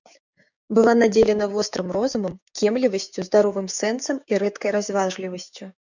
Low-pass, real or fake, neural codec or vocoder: 7.2 kHz; fake; codec, 16 kHz, 6 kbps, DAC